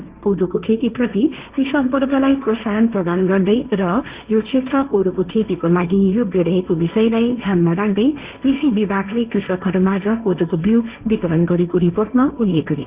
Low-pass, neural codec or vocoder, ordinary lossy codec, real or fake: 3.6 kHz; codec, 16 kHz, 1.1 kbps, Voila-Tokenizer; Opus, 64 kbps; fake